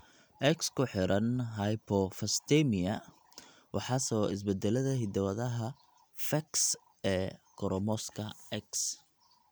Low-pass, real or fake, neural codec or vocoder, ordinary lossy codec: none; real; none; none